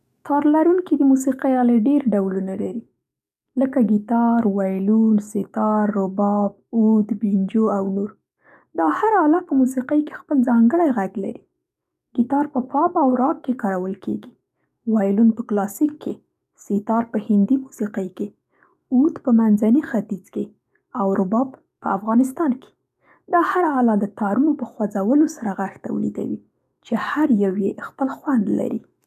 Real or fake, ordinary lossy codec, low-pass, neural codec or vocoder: fake; none; 14.4 kHz; codec, 44.1 kHz, 7.8 kbps, DAC